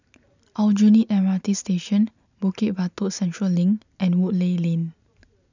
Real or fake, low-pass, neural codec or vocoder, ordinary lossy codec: real; 7.2 kHz; none; none